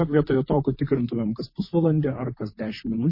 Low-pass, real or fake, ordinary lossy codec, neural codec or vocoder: 5.4 kHz; fake; MP3, 24 kbps; vocoder, 44.1 kHz, 128 mel bands, Pupu-Vocoder